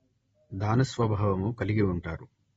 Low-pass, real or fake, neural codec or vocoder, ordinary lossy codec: 19.8 kHz; real; none; AAC, 24 kbps